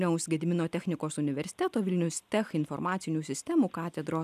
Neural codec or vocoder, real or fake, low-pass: none; real; 14.4 kHz